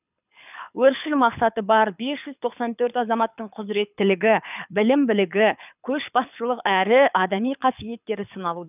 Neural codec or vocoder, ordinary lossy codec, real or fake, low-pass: codec, 24 kHz, 6 kbps, HILCodec; none; fake; 3.6 kHz